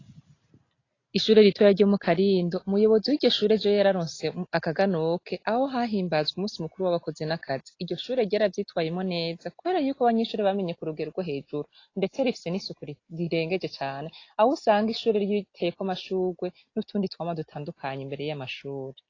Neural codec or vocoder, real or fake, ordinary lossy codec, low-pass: none; real; AAC, 32 kbps; 7.2 kHz